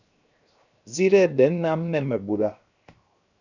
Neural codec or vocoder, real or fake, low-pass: codec, 16 kHz, 0.7 kbps, FocalCodec; fake; 7.2 kHz